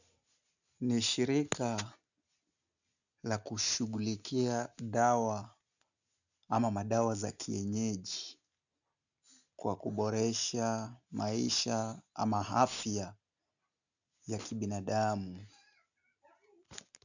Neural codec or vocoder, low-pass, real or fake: none; 7.2 kHz; real